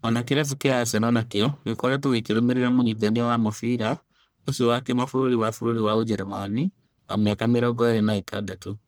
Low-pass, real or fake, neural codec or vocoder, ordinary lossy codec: none; fake; codec, 44.1 kHz, 1.7 kbps, Pupu-Codec; none